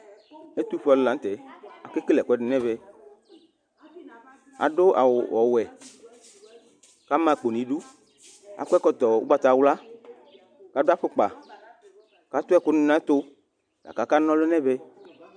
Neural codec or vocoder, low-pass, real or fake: none; 9.9 kHz; real